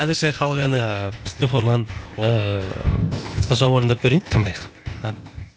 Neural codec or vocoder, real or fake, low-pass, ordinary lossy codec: codec, 16 kHz, 0.8 kbps, ZipCodec; fake; none; none